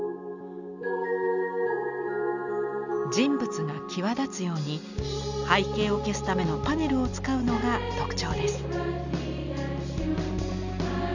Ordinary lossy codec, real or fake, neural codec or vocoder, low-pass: none; real; none; 7.2 kHz